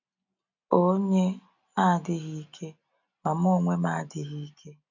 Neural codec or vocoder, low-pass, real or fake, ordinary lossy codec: none; 7.2 kHz; real; none